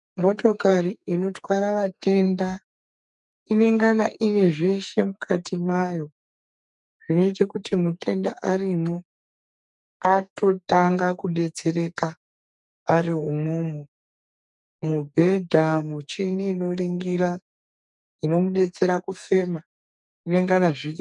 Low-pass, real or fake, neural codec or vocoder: 10.8 kHz; fake; codec, 44.1 kHz, 2.6 kbps, SNAC